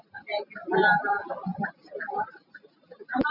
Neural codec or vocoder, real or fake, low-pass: none; real; 5.4 kHz